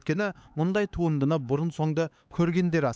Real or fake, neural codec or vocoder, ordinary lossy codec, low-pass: fake; codec, 16 kHz, 4 kbps, X-Codec, HuBERT features, trained on LibriSpeech; none; none